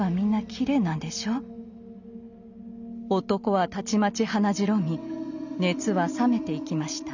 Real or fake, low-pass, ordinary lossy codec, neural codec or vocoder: real; 7.2 kHz; none; none